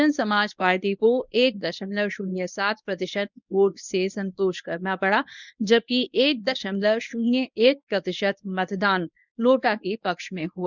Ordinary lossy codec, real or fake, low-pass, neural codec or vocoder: none; fake; 7.2 kHz; codec, 24 kHz, 0.9 kbps, WavTokenizer, medium speech release version 1